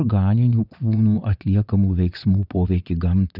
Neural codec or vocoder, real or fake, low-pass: vocoder, 22.05 kHz, 80 mel bands, WaveNeXt; fake; 5.4 kHz